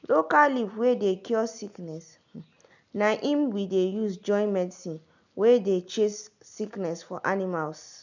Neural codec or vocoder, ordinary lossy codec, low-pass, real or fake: none; none; 7.2 kHz; real